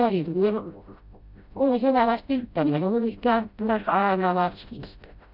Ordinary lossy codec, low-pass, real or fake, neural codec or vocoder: none; 5.4 kHz; fake; codec, 16 kHz, 0.5 kbps, FreqCodec, smaller model